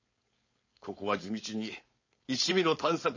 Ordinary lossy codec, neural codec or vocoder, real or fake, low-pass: MP3, 32 kbps; codec, 16 kHz, 4.8 kbps, FACodec; fake; 7.2 kHz